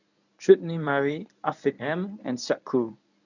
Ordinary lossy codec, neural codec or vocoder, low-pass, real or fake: none; codec, 24 kHz, 0.9 kbps, WavTokenizer, medium speech release version 1; 7.2 kHz; fake